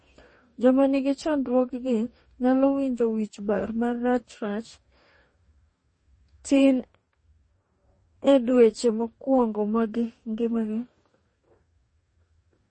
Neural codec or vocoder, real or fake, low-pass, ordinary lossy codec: codec, 44.1 kHz, 2.6 kbps, DAC; fake; 9.9 kHz; MP3, 32 kbps